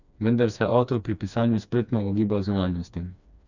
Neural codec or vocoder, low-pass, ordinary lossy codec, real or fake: codec, 16 kHz, 2 kbps, FreqCodec, smaller model; 7.2 kHz; none; fake